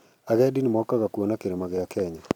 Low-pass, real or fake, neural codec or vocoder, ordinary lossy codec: 19.8 kHz; fake; vocoder, 44.1 kHz, 128 mel bands every 256 samples, BigVGAN v2; none